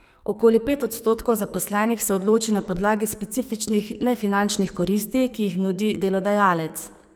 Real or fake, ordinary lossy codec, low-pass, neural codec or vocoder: fake; none; none; codec, 44.1 kHz, 2.6 kbps, SNAC